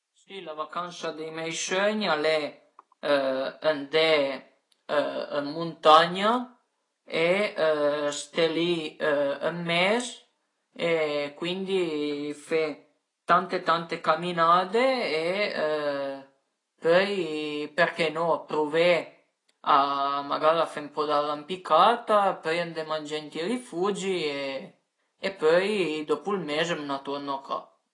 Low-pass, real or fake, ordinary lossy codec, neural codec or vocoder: 10.8 kHz; real; AAC, 32 kbps; none